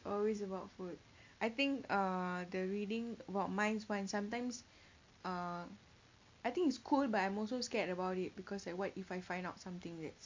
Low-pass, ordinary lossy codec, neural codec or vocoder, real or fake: 7.2 kHz; MP3, 48 kbps; none; real